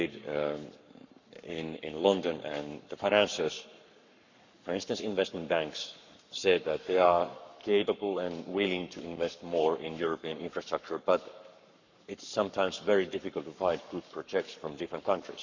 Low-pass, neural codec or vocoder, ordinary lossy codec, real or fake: 7.2 kHz; codec, 44.1 kHz, 7.8 kbps, Pupu-Codec; none; fake